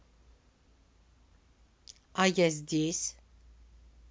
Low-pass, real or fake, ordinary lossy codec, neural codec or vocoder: none; real; none; none